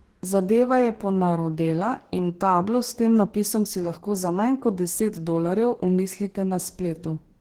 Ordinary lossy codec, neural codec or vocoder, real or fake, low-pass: Opus, 16 kbps; codec, 44.1 kHz, 2.6 kbps, DAC; fake; 19.8 kHz